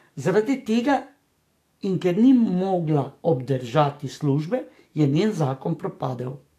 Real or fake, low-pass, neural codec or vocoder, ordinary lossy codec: fake; 14.4 kHz; codec, 44.1 kHz, 7.8 kbps, DAC; AAC, 64 kbps